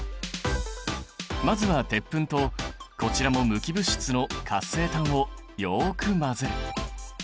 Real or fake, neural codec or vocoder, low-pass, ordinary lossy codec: real; none; none; none